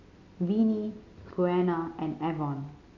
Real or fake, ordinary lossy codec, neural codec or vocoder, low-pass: real; none; none; 7.2 kHz